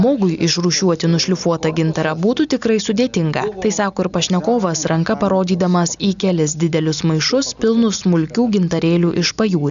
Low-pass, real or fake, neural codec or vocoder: 7.2 kHz; real; none